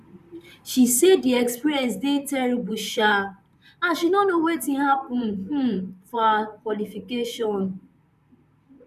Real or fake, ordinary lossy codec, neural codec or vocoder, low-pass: fake; none; vocoder, 44.1 kHz, 128 mel bands, Pupu-Vocoder; 14.4 kHz